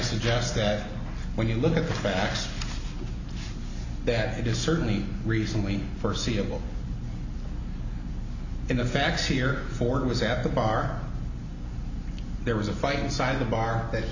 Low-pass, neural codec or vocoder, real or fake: 7.2 kHz; none; real